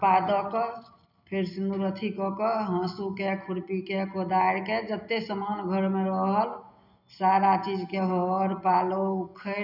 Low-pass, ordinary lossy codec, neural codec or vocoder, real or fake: 5.4 kHz; none; none; real